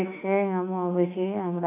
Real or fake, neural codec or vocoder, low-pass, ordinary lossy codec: fake; autoencoder, 48 kHz, 32 numbers a frame, DAC-VAE, trained on Japanese speech; 3.6 kHz; none